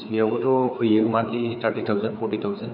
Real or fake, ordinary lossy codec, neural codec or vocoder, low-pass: fake; none; codec, 16 kHz, 4 kbps, FreqCodec, larger model; 5.4 kHz